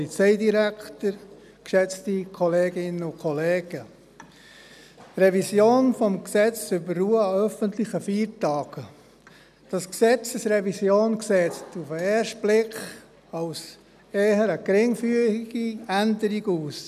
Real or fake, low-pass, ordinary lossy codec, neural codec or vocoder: real; 14.4 kHz; none; none